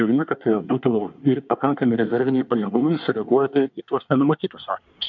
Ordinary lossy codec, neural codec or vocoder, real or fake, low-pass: MP3, 64 kbps; codec, 24 kHz, 1 kbps, SNAC; fake; 7.2 kHz